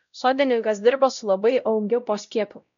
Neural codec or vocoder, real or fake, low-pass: codec, 16 kHz, 0.5 kbps, X-Codec, WavLM features, trained on Multilingual LibriSpeech; fake; 7.2 kHz